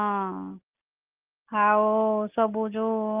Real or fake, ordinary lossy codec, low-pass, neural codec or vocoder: real; Opus, 24 kbps; 3.6 kHz; none